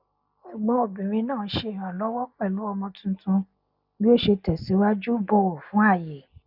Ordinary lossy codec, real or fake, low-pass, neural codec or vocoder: none; real; 5.4 kHz; none